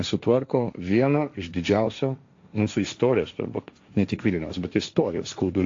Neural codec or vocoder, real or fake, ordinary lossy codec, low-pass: codec, 16 kHz, 1.1 kbps, Voila-Tokenizer; fake; AAC, 48 kbps; 7.2 kHz